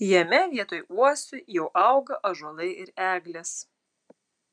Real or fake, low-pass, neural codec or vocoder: real; 9.9 kHz; none